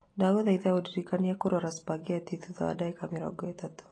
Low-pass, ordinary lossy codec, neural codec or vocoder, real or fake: 9.9 kHz; AAC, 32 kbps; none; real